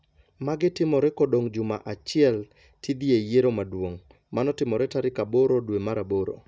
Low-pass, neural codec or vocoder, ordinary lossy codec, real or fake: none; none; none; real